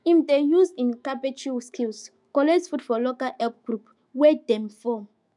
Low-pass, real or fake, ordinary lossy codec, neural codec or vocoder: 10.8 kHz; fake; none; autoencoder, 48 kHz, 128 numbers a frame, DAC-VAE, trained on Japanese speech